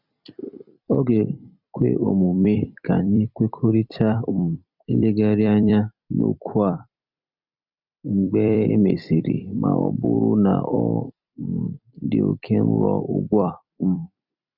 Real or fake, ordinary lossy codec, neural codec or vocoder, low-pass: fake; none; vocoder, 44.1 kHz, 128 mel bands every 256 samples, BigVGAN v2; 5.4 kHz